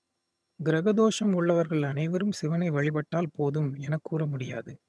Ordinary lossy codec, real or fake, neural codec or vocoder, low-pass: none; fake; vocoder, 22.05 kHz, 80 mel bands, HiFi-GAN; none